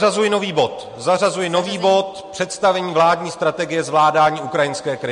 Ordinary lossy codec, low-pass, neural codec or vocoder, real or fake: MP3, 48 kbps; 10.8 kHz; none; real